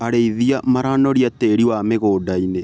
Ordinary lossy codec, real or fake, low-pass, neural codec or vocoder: none; real; none; none